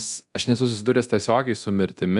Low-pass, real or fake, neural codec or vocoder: 10.8 kHz; fake; codec, 24 kHz, 0.9 kbps, DualCodec